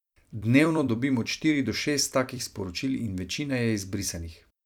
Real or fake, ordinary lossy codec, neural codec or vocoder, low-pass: fake; none; vocoder, 44.1 kHz, 128 mel bands every 256 samples, BigVGAN v2; 19.8 kHz